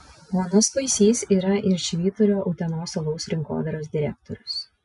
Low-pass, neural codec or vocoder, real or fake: 10.8 kHz; none; real